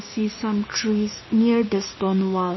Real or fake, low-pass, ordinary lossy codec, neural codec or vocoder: fake; 7.2 kHz; MP3, 24 kbps; codec, 16 kHz, 6 kbps, DAC